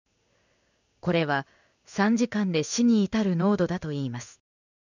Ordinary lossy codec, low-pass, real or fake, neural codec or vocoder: none; 7.2 kHz; fake; codec, 16 kHz in and 24 kHz out, 1 kbps, XY-Tokenizer